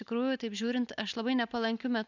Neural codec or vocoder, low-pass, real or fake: none; 7.2 kHz; real